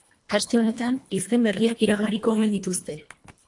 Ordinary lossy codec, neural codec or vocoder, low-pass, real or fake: AAC, 64 kbps; codec, 24 kHz, 1.5 kbps, HILCodec; 10.8 kHz; fake